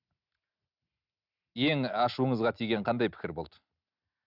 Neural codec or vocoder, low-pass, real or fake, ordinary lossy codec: none; 5.4 kHz; real; none